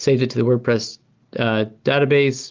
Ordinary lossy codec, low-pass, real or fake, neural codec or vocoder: Opus, 32 kbps; 7.2 kHz; real; none